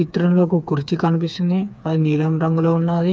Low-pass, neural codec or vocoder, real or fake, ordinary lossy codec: none; codec, 16 kHz, 4 kbps, FreqCodec, smaller model; fake; none